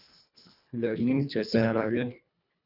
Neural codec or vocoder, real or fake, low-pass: codec, 24 kHz, 1.5 kbps, HILCodec; fake; 5.4 kHz